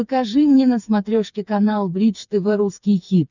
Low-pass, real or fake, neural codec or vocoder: 7.2 kHz; fake; codec, 16 kHz, 4 kbps, FreqCodec, smaller model